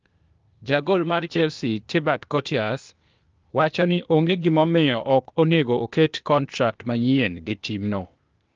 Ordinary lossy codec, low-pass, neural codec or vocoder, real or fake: Opus, 24 kbps; 7.2 kHz; codec, 16 kHz, 0.8 kbps, ZipCodec; fake